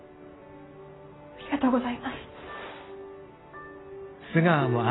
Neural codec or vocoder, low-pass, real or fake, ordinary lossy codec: none; 7.2 kHz; real; AAC, 16 kbps